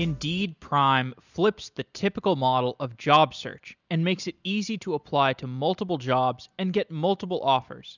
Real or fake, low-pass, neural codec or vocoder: real; 7.2 kHz; none